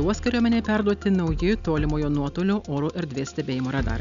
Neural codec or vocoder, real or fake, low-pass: none; real; 7.2 kHz